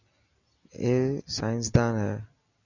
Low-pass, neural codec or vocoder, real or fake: 7.2 kHz; none; real